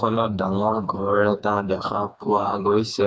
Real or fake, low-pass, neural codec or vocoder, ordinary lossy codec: fake; none; codec, 16 kHz, 2 kbps, FreqCodec, smaller model; none